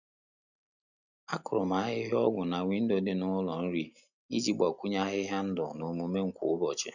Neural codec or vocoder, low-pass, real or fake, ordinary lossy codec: none; 7.2 kHz; real; none